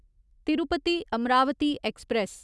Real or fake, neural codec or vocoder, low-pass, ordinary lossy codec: real; none; none; none